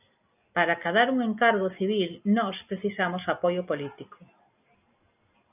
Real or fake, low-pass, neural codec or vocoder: real; 3.6 kHz; none